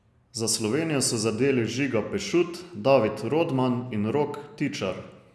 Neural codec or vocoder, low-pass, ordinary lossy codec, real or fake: none; none; none; real